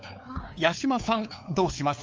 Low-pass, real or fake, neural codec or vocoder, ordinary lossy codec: 7.2 kHz; fake; codec, 16 kHz, 4 kbps, X-Codec, WavLM features, trained on Multilingual LibriSpeech; Opus, 24 kbps